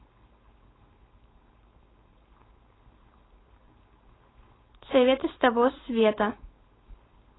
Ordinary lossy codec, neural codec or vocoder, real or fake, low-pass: AAC, 16 kbps; codec, 16 kHz, 4.8 kbps, FACodec; fake; 7.2 kHz